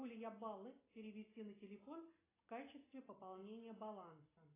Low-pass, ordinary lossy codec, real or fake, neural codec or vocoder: 3.6 kHz; AAC, 16 kbps; real; none